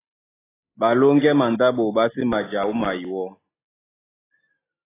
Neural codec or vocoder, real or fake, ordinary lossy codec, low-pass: none; real; AAC, 16 kbps; 3.6 kHz